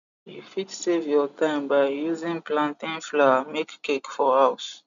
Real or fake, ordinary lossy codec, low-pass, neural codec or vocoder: real; none; 7.2 kHz; none